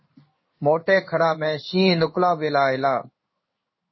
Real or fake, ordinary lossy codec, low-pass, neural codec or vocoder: fake; MP3, 24 kbps; 7.2 kHz; codec, 16 kHz in and 24 kHz out, 1 kbps, XY-Tokenizer